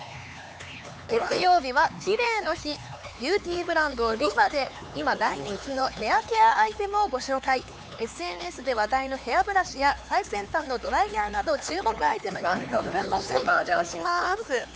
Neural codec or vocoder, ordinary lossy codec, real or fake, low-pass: codec, 16 kHz, 4 kbps, X-Codec, HuBERT features, trained on LibriSpeech; none; fake; none